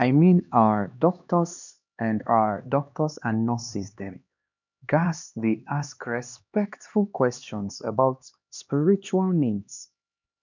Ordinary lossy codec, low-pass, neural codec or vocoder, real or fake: none; 7.2 kHz; codec, 16 kHz, 2 kbps, X-Codec, HuBERT features, trained on LibriSpeech; fake